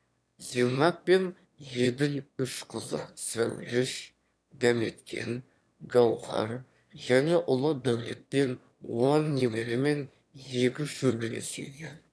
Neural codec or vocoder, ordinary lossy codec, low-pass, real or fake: autoencoder, 22.05 kHz, a latent of 192 numbers a frame, VITS, trained on one speaker; none; none; fake